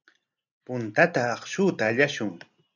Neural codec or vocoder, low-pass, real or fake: none; 7.2 kHz; real